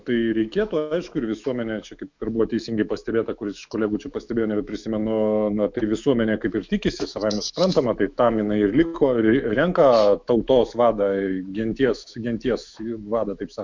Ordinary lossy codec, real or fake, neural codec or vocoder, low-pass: MP3, 64 kbps; fake; autoencoder, 48 kHz, 128 numbers a frame, DAC-VAE, trained on Japanese speech; 7.2 kHz